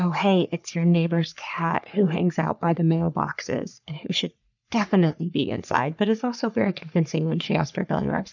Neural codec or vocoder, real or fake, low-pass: codec, 44.1 kHz, 3.4 kbps, Pupu-Codec; fake; 7.2 kHz